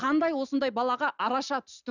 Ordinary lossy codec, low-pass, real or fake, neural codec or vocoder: none; 7.2 kHz; real; none